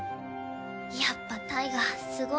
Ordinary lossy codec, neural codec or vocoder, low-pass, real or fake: none; none; none; real